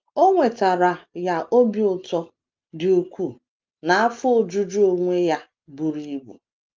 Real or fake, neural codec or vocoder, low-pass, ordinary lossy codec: real; none; 7.2 kHz; Opus, 24 kbps